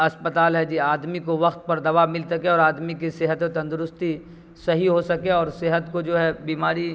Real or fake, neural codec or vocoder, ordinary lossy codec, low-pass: real; none; none; none